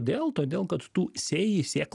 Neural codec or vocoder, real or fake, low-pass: none; real; 10.8 kHz